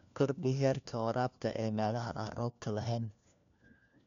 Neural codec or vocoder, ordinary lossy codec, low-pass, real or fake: codec, 16 kHz, 1 kbps, FunCodec, trained on LibriTTS, 50 frames a second; MP3, 96 kbps; 7.2 kHz; fake